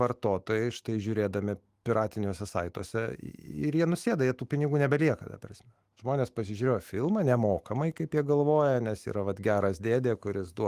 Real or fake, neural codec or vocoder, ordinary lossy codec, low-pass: fake; autoencoder, 48 kHz, 128 numbers a frame, DAC-VAE, trained on Japanese speech; Opus, 24 kbps; 14.4 kHz